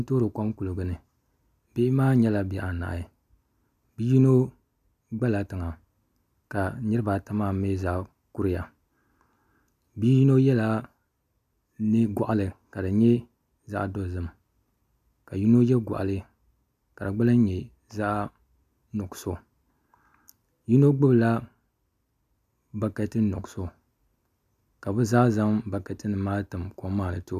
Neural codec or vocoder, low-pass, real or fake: none; 14.4 kHz; real